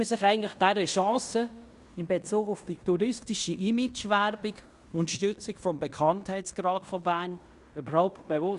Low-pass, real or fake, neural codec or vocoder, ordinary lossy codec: 10.8 kHz; fake; codec, 16 kHz in and 24 kHz out, 0.9 kbps, LongCat-Audio-Codec, fine tuned four codebook decoder; Opus, 64 kbps